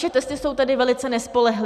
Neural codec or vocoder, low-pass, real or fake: none; 14.4 kHz; real